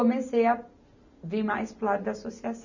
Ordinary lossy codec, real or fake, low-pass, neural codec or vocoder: none; real; 7.2 kHz; none